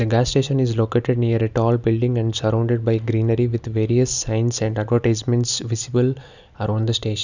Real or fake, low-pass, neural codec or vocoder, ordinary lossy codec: real; 7.2 kHz; none; none